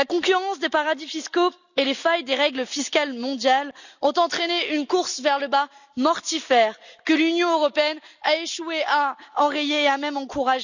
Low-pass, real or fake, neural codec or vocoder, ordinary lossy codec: 7.2 kHz; real; none; none